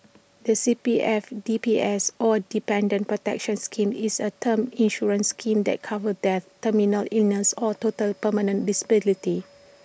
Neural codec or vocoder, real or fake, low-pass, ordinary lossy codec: none; real; none; none